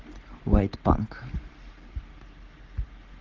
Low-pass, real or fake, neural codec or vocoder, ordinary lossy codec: 7.2 kHz; real; none; Opus, 16 kbps